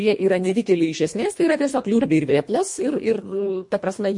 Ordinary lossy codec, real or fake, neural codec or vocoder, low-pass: MP3, 48 kbps; fake; codec, 24 kHz, 1.5 kbps, HILCodec; 10.8 kHz